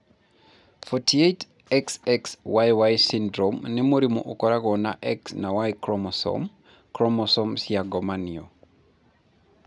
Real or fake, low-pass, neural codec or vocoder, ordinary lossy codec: real; 10.8 kHz; none; none